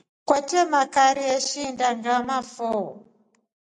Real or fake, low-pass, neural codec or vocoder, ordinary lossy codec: real; 10.8 kHz; none; MP3, 96 kbps